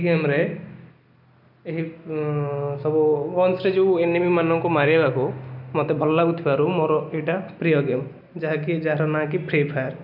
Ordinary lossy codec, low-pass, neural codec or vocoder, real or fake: none; 5.4 kHz; none; real